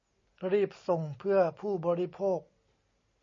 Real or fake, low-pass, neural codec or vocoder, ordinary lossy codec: real; 7.2 kHz; none; MP3, 32 kbps